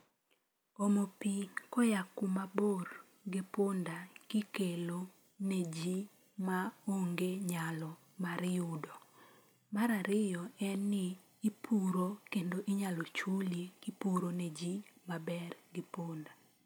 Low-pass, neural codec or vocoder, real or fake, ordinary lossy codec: none; none; real; none